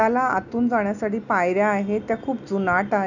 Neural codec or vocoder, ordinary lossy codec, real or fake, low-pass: none; none; real; 7.2 kHz